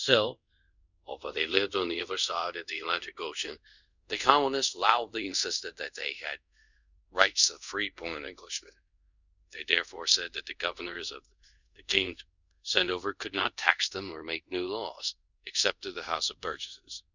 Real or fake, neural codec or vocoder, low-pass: fake; codec, 24 kHz, 0.5 kbps, DualCodec; 7.2 kHz